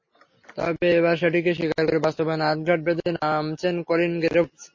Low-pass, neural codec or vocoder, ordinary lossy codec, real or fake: 7.2 kHz; none; MP3, 32 kbps; real